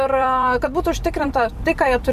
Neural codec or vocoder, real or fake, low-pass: vocoder, 44.1 kHz, 128 mel bands every 256 samples, BigVGAN v2; fake; 14.4 kHz